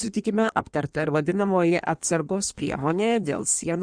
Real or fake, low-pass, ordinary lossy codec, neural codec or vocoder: fake; 9.9 kHz; Opus, 64 kbps; codec, 16 kHz in and 24 kHz out, 1.1 kbps, FireRedTTS-2 codec